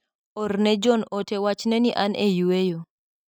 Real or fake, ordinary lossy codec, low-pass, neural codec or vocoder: real; none; 19.8 kHz; none